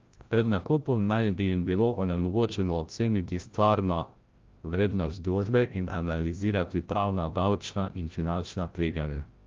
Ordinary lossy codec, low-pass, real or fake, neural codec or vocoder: Opus, 32 kbps; 7.2 kHz; fake; codec, 16 kHz, 0.5 kbps, FreqCodec, larger model